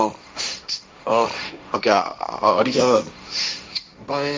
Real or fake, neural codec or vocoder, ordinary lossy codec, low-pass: fake; codec, 16 kHz, 1.1 kbps, Voila-Tokenizer; none; none